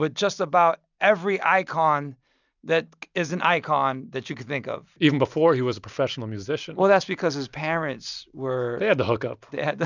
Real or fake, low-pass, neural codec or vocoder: real; 7.2 kHz; none